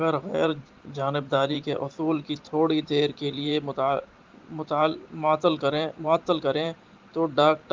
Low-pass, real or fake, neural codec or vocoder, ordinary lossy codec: 7.2 kHz; fake; vocoder, 44.1 kHz, 128 mel bands every 512 samples, BigVGAN v2; Opus, 32 kbps